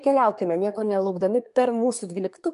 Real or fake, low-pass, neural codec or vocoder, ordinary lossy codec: fake; 10.8 kHz; codec, 24 kHz, 1 kbps, SNAC; MP3, 64 kbps